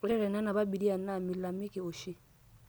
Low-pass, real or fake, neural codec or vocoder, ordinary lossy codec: none; real; none; none